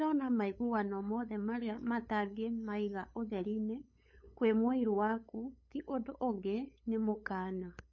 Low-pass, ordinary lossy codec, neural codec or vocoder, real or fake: 7.2 kHz; MP3, 32 kbps; codec, 16 kHz, 8 kbps, FunCodec, trained on LibriTTS, 25 frames a second; fake